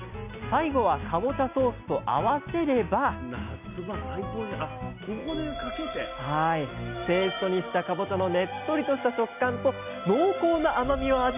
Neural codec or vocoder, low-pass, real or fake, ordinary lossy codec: none; 3.6 kHz; real; none